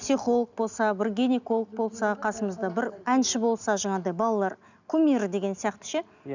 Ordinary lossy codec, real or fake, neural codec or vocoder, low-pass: none; real; none; 7.2 kHz